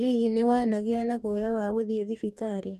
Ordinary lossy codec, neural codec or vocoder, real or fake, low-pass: none; codec, 44.1 kHz, 2.6 kbps, DAC; fake; 14.4 kHz